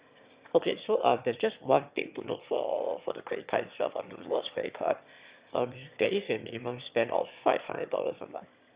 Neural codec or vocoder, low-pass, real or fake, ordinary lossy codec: autoencoder, 22.05 kHz, a latent of 192 numbers a frame, VITS, trained on one speaker; 3.6 kHz; fake; Opus, 64 kbps